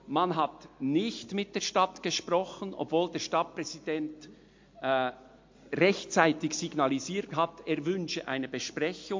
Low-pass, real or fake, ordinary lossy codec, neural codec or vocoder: 7.2 kHz; real; MP3, 48 kbps; none